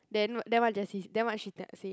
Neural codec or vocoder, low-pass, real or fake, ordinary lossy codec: none; none; real; none